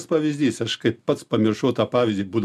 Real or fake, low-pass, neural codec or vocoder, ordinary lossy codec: real; 14.4 kHz; none; MP3, 96 kbps